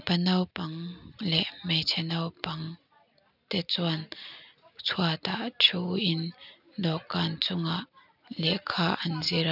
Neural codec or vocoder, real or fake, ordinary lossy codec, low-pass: none; real; none; 5.4 kHz